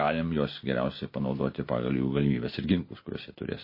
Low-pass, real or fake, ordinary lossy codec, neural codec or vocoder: 5.4 kHz; real; MP3, 24 kbps; none